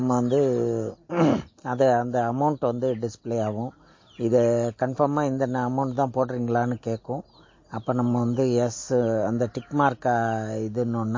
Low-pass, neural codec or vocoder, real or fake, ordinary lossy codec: 7.2 kHz; none; real; MP3, 32 kbps